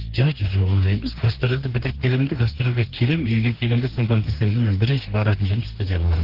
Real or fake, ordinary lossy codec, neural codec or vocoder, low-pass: fake; Opus, 16 kbps; codec, 44.1 kHz, 2.6 kbps, DAC; 5.4 kHz